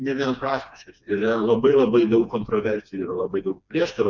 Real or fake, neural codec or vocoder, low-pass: fake; codec, 16 kHz, 2 kbps, FreqCodec, smaller model; 7.2 kHz